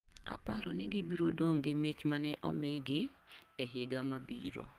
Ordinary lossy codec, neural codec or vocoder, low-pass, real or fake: Opus, 32 kbps; codec, 32 kHz, 1.9 kbps, SNAC; 14.4 kHz; fake